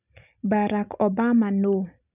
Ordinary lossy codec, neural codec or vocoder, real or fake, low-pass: none; none; real; 3.6 kHz